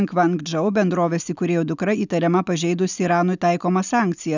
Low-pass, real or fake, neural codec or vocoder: 7.2 kHz; real; none